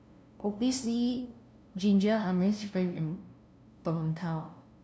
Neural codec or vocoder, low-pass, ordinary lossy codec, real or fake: codec, 16 kHz, 0.5 kbps, FunCodec, trained on LibriTTS, 25 frames a second; none; none; fake